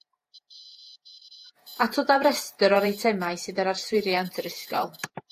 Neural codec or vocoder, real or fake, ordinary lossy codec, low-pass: vocoder, 48 kHz, 128 mel bands, Vocos; fake; AAC, 64 kbps; 14.4 kHz